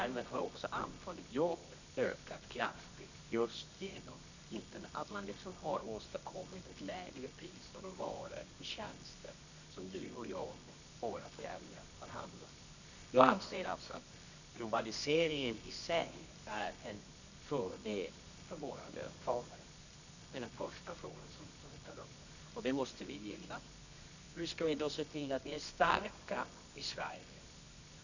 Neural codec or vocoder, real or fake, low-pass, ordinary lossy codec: codec, 24 kHz, 0.9 kbps, WavTokenizer, medium music audio release; fake; 7.2 kHz; none